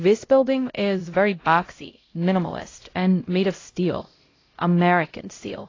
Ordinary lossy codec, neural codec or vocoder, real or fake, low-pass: AAC, 32 kbps; codec, 16 kHz, 0.5 kbps, X-Codec, HuBERT features, trained on LibriSpeech; fake; 7.2 kHz